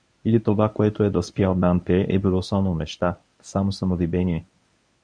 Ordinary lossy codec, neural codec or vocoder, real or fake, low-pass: MP3, 96 kbps; codec, 24 kHz, 0.9 kbps, WavTokenizer, medium speech release version 1; fake; 9.9 kHz